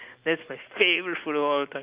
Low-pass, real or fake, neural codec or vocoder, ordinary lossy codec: 3.6 kHz; real; none; Opus, 32 kbps